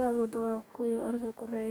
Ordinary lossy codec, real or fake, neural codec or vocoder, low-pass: none; fake; codec, 44.1 kHz, 2.6 kbps, DAC; none